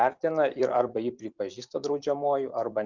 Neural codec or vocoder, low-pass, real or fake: vocoder, 44.1 kHz, 128 mel bands every 256 samples, BigVGAN v2; 7.2 kHz; fake